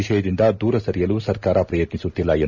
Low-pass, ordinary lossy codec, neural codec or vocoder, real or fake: 7.2 kHz; none; none; real